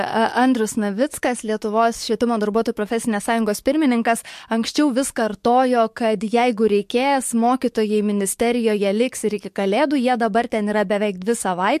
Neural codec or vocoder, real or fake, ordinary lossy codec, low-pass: codec, 44.1 kHz, 7.8 kbps, DAC; fake; MP3, 64 kbps; 14.4 kHz